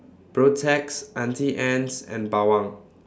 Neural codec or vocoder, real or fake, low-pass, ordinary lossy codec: none; real; none; none